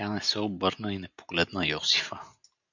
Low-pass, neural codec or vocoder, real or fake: 7.2 kHz; none; real